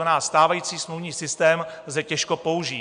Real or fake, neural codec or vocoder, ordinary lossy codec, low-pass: real; none; AAC, 96 kbps; 9.9 kHz